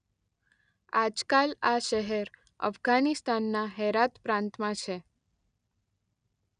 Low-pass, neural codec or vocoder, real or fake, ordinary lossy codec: 9.9 kHz; none; real; none